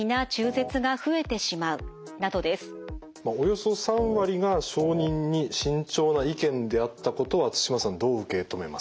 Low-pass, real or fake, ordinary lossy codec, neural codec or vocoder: none; real; none; none